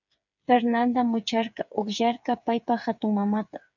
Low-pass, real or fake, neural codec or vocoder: 7.2 kHz; fake; codec, 16 kHz, 8 kbps, FreqCodec, smaller model